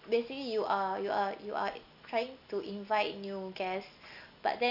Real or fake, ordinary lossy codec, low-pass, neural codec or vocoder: real; none; 5.4 kHz; none